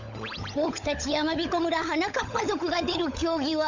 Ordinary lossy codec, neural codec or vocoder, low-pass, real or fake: none; codec, 16 kHz, 16 kbps, FunCodec, trained on LibriTTS, 50 frames a second; 7.2 kHz; fake